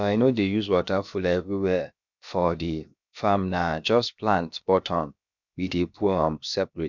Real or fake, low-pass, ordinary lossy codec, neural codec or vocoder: fake; 7.2 kHz; none; codec, 16 kHz, about 1 kbps, DyCAST, with the encoder's durations